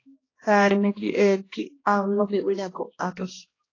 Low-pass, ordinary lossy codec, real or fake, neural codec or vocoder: 7.2 kHz; AAC, 32 kbps; fake; codec, 16 kHz, 1 kbps, X-Codec, HuBERT features, trained on balanced general audio